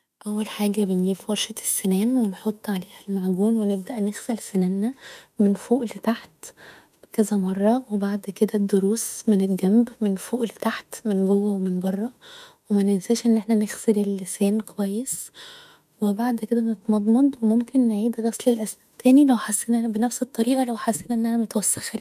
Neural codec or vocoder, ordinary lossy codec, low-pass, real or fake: autoencoder, 48 kHz, 32 numbers a frame, DAC-VAE, trained on Japanese speech; none; 14.4 kHz; fake